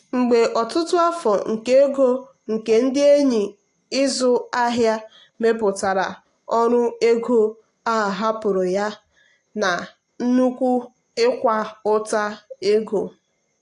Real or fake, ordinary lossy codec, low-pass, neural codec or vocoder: real; AAC, 48 kbps; 10.8 kHz; none